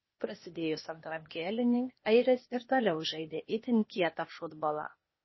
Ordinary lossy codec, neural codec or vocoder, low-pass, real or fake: MP3, 24 kbps; codec, 16 kHz, 0.8 kbps, ZipCodec; 7.2 kHz; fake